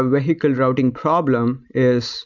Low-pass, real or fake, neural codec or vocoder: 7.2 kHz; real; none